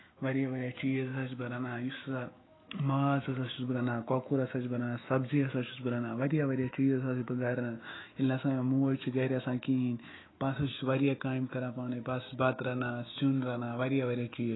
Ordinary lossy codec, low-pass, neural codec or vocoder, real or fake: AAC, 16 kbps; 7.2 kHz; autoencoder, 48 kHz, 128 numbers a frame, DAC-VAE, trained on Japanese speech; fake